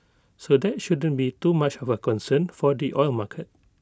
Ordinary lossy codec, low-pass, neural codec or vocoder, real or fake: none; none; none; real